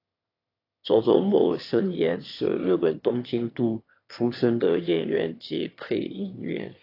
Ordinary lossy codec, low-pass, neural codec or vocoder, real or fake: AAC, 32 kbps; 5.4 kHz; autoencoder, 22.05 kHz, a latent of 192 numbers a frame, VITS, trained on one speaker; fake